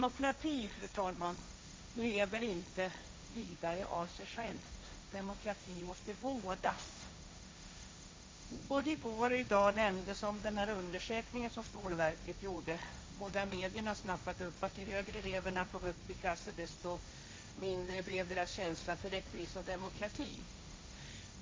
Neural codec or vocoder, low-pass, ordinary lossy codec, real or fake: codec, 16 kHz, 1.1 kbps, Voila-Tokenizer; none; none; fake